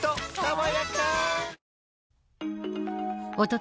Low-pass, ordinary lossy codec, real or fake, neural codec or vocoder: none; none; real; none